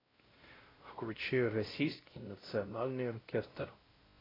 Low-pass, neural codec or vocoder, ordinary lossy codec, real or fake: 5.4 kHz; codec, 16 kHz, 0.5 kbps, X-Codec, WavLM features, trained on Multilingual LibriSpeech; AAC, 24 kbps; fake